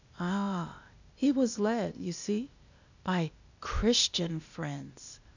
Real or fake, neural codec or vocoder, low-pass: fake; codec, 16 kHz, 0.8 kbps, ZipCodec; 7.2 kHz